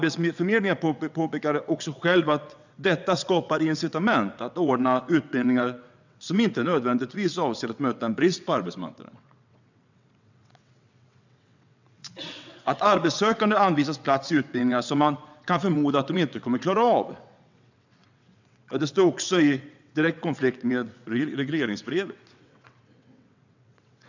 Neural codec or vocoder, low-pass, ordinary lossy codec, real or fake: vocoder, 22.05 kHz, 80 mel bands, WaveNeXt; 7.2 kHz; none; fake